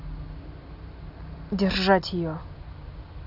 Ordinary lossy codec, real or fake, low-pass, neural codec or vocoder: none; real; 5.4 kHz; none